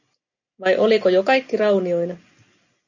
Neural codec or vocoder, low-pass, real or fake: none; 7.2 kHz; real